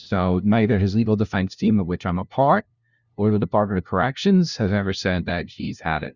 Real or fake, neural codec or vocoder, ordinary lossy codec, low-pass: fake; codec, 16 kHz, 0.5 kbps, FunCodec, trained on LibriTTS, 25 frames a second; Opus, 64 kbps; 7.2 kHz